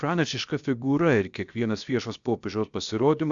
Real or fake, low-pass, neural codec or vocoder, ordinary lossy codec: fake; 7.2 kHz; codec, 16 kHz, 0.7 kbps, FocalCodec; Opus, 64 kbps